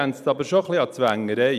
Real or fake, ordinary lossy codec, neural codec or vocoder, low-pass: real; none; none; 14.4 kHz